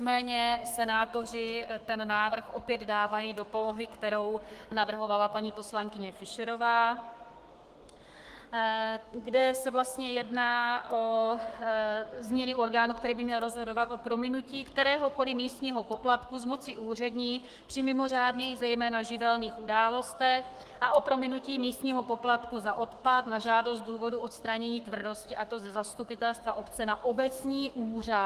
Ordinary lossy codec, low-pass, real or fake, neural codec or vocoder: Opus, 24 kbps; 14.4 kHz; fake; codec, 32 kHz, 1.9 kbps, SNAC